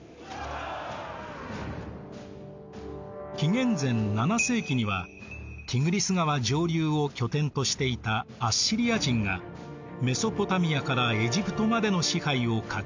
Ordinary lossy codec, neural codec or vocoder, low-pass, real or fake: MP3, 64 kbps; none; 7.2 kHz; real